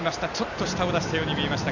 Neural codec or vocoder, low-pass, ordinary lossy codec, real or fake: none; 7.2 kHz; none; real